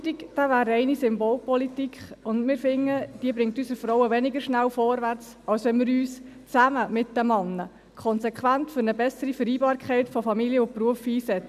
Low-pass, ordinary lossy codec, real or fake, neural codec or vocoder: 14.4 kHz; none; real; none